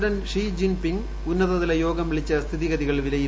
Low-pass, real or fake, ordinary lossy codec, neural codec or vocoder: none; real; none; none